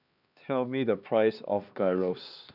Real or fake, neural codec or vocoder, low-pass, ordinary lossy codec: fake; codec, 16 kHz, 2 kbps, X-Codec, HuBERT features, trained on LibriSpeech; 5.4 kHz; none